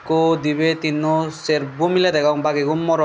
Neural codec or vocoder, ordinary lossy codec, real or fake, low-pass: none; none; real; none